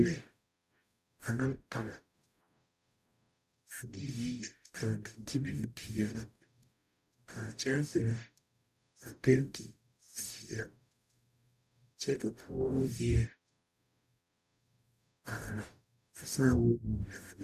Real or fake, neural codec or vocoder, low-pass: fake; codec, 44.1 kHz, 0.9 kbps, DAC; 14.4 kHz